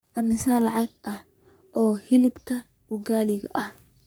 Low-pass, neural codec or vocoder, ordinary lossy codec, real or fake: none; codec, 44.1 kHz, 3.4 kbps, Pupu-Codec; none; fake